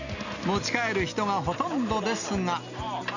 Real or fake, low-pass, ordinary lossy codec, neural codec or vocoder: real; 7.2 kHz; none; none